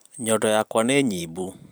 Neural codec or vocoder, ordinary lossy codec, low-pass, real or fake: vocoder, 44.1 kHz, 128 mel bands every 256 samples, BigVGAN v2; none; none; fake